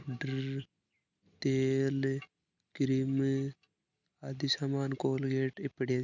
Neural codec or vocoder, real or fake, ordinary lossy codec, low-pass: none; real; none; 7.2 kHz